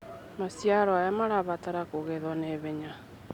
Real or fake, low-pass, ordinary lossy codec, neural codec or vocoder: real; 19.8 kHz; none; none